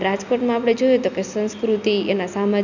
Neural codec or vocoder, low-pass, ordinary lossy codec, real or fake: none; 7.2 kHz; none; real